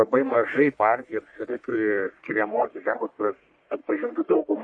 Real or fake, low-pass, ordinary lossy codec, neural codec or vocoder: fake; 9.9 kHz; MP3, 48 kbps; codec, 44.1 kHz, 1.7 kbps, Pupu-Codec